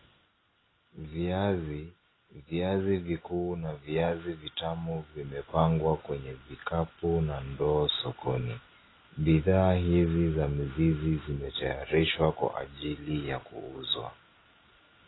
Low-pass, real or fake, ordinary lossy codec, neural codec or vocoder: 7.2 kHz; real; AAC, 16 kbps; none